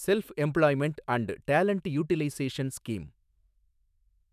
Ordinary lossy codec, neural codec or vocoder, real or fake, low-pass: none; autoencoder, 48 kHz, 128 numbers a frame, DAC-VAE, trained on Japanese speech; fake; 14.4 kHz